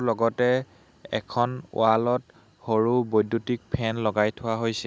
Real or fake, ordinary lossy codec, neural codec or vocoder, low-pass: real; none; none; none